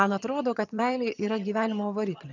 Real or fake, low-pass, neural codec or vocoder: fake; 7.2 kHz; vocoder, 22.05 kHz, 80 mel bands, HiFi-GAN